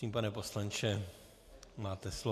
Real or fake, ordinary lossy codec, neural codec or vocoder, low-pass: real; AAC, 64 kbps; none; 14.4 kHz